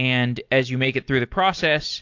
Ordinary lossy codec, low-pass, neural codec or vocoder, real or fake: AAC, 48 kbps; 7.2 kHz; none; real